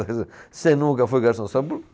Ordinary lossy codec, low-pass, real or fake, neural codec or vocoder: none; none; real; none